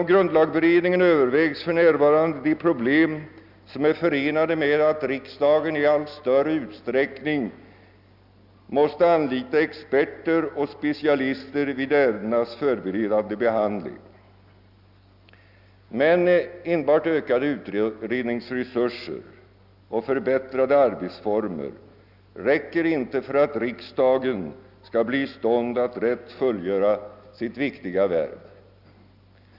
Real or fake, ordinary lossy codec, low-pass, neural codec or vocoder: real; none; 5.4 kHz; none